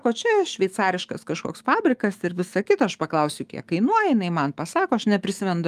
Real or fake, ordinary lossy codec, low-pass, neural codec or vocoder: fake; Opus, 32 kbps; 14.4 kHz; autoencoder, 48 kHz, 128 numbers a frame, DAC-VAE, trained on Japanese speech